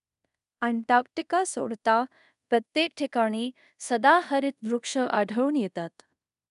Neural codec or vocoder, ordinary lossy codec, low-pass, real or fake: codec, 24 kHz, 0.5 kbps, DualCodec; none; 10.8 kHz; fake